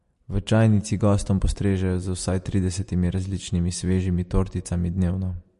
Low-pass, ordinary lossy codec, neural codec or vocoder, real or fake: 10.8 kHz; MP3, 48 kbps; none; real